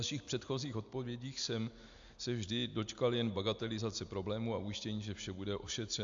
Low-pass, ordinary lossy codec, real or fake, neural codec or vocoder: 7.2 kHz; AAC, 64 kbps; real; none